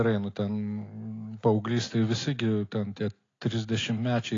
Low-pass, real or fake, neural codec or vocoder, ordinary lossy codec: 7.2 kHz; real; none; AAC, 32 kbps